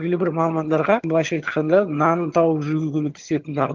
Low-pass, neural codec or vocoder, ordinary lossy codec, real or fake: 7.2 kHz; vocoder, 22.05 kHz, 80 mel bands, HiFi-GAN; Opus, 32 kbps; fake